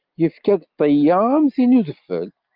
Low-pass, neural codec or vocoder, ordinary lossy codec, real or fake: 5.4 kHz; none; Opus, 24 kbps; real